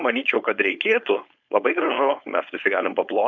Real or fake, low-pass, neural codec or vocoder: fake; 7.2 kHz; codec, 16 kHz, 4.8 kbps, FACodec